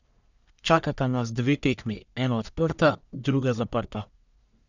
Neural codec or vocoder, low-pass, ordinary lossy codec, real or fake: codec, 44.1 kHz, 1.7 kbps, Pupu-Codec; 7.2 kHz; none; fake